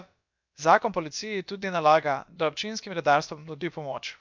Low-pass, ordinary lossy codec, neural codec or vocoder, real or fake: 7.2 kHz; MP3, 64 kbps; codec, 16 kHz, about 1 kbps, DyCAST, with the encoder's durations; fake